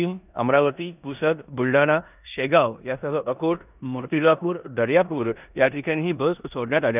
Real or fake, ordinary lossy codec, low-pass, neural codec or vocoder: fake; none; 3.6 kHz; codec, 16 kHz in and 24 kHz out, 0.9 kbps, LongCat-Audio-Codec, four codebook decoder